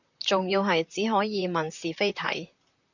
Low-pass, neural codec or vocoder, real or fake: 7.2 kHz; vocoder, 44.1 kHz, 128 mel bands, Pupu-Vocoder; fake